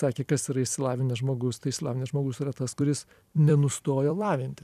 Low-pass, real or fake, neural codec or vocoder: 14.4 kHz; fake; vocoder, 44.1 kHz, 128 mel bands every 256 samples, BigVGAN v2